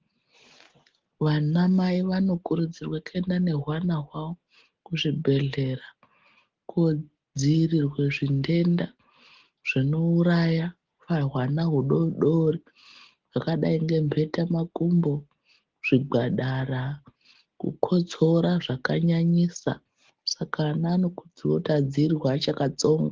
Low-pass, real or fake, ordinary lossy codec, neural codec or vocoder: 7.2 kHz; real; Opus, 16 kbps; none